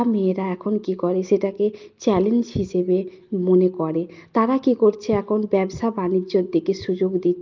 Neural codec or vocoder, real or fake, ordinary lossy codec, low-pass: none; real; Opus, 24 kbps; 7.2 kHz